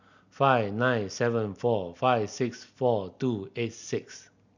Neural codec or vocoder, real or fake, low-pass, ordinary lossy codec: none; real; 7.2 kHz; none